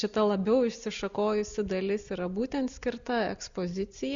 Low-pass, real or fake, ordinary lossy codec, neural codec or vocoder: 7.2 kHz; real; Opus, 64 kbps; none